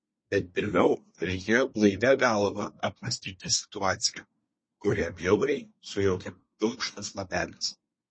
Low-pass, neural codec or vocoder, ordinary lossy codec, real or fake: 9.9 kHz; codec, 24 kHz, 1 kbps, SNAC; MP3, 32 kbps; fake